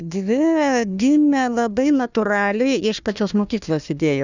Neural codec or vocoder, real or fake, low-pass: codec, 16 kHz, 1 kbps, FunCodec, trained on Chinese and English, 50 frames a second; fake; 7.2 kHz